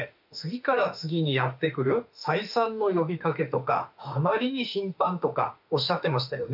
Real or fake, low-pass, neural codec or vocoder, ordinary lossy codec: fake; 5.4 kHz; autoencoder, 48 kHz, 32 numbers a frame, DAC-VAE, trained on Japanese speech; none